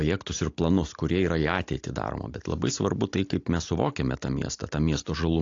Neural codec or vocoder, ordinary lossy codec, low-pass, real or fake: none; AAC, 48 kbps; 7.2 kHz; real